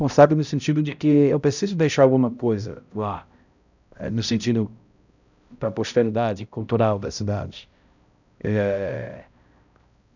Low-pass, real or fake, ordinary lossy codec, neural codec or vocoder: 7.2 kHz; fake; none; codec, 16 kHz, 0.5 kbps, X-Codec, HuBERT features, trained on balanced general audio